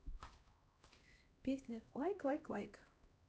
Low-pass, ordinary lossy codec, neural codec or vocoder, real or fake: none; none; codec, 16 kHz, 1 kbps, X-Codec, HuBERT features, trained on LibriSpeech; fake